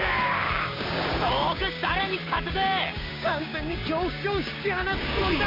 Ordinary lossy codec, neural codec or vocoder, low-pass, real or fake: MP3, 32 kbps; codec, 16 kHz, 6 kbps, DAC; 5.4 kHz; fake